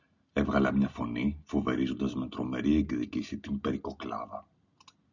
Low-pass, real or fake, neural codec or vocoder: 7.2 kHz; real; none